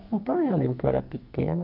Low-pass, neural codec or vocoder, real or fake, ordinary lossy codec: 5.4 kHz; codec, 44.1 kHz, 2.6 kbps, SNAC; fake; none